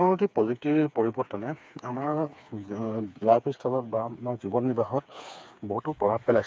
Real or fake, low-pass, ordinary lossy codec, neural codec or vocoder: fake; none; none; codec, 16 kHz, 4 kbps, FreqCodec, smaller model